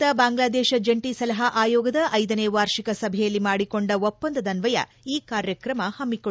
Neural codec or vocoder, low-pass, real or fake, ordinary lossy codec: none; none; real; none